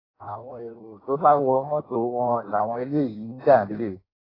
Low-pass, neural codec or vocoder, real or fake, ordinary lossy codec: 5.4 kHz; codec, 16 kHz in and 24 kHz out, 0.6 kbps, FireRedTTS-2 codec; fake; AAC, 24 kbps